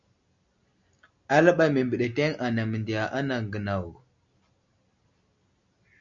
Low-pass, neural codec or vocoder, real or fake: 7.2 kHz; none; real